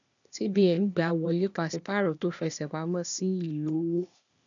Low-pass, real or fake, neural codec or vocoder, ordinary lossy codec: 7.2 kHz; fake; codec, 16 kHz, 0.8 kbps, ZipCodec; none